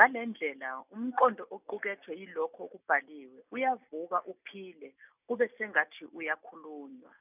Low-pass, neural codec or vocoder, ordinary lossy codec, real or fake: 3.6 kHz; none; none; real